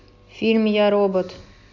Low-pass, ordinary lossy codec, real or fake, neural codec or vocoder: 7.2 kHz; AAC, 48 kbps; real; none